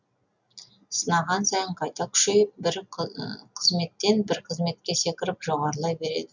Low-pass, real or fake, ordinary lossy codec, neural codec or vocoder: 7.2 kHz; real; none; none